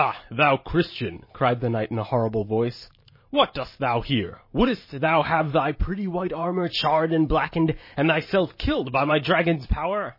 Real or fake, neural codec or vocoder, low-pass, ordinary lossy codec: fake; vocoder, 44.1 kHz, 128 mel bands every 512 samples, BigVGAN v2; 5.4 kHz; MP3, 24 kbps